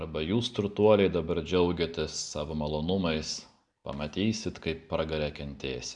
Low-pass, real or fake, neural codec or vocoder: 9.9 kHz; real; none